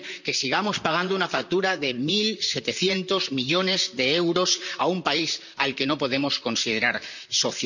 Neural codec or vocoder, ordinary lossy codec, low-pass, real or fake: vocoder, 44.1 kHz, 128 mel bands, Pupu-Vocoder; none; 7.2 kHz; fake